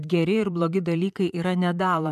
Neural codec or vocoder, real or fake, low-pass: vocoder, 44.1 kHz, 128 mel bands, Pupu-Vocoder; fake; 14.4 kHz